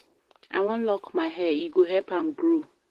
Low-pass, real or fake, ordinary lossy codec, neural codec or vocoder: 14.4 kHz; fake; Opus, 16 kbps; vocoder, 44.1 kHz, 128 mel bands, Pupu-Vocoder